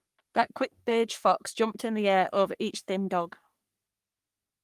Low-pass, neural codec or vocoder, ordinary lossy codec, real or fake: 14.4 kHz; codec, 44.1 kHz, 3.4 kbps, Pupu-Codec; Opus, 32 kbps; fake